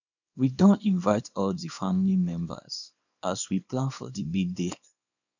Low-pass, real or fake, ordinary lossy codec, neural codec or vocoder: 7.2 kHz; fake; none; codec, 24 kHz, 0.9 kbps, WavTokenizer, small release